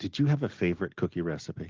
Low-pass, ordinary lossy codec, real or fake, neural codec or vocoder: 7.2 kHz; Opus, 16 kbps; real; none